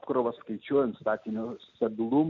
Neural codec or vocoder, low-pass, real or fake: none; 7.2 kHz; real